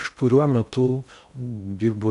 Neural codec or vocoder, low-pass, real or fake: codec, 16 kHz in and 24 kHz out, 0.6 kbps, FocalCodec, streaming, 2048 codes; 10.8 kHz; fake